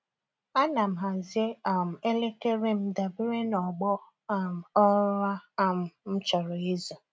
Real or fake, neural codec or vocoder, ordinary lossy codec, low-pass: real; none; none; none